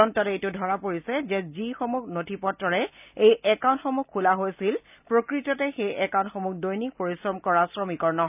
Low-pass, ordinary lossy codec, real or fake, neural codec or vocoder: 3.6 kHz; none; real; none